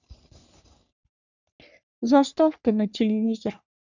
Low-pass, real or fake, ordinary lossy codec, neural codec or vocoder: 7.2 kHz; fake; none; codec, 44.1 kHz, 3.4 kbps, Pupu-Codec